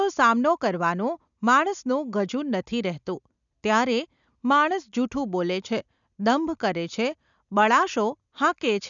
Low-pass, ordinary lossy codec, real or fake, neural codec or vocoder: 7.2 kHz; none; real; none